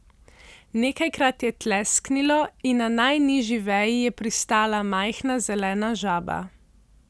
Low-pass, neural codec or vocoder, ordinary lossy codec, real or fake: none; none; none; real